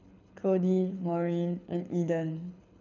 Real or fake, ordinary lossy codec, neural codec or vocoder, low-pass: fake; none; codec, 24 kHz, 6 kbps, HILCodec; 7.2 kHz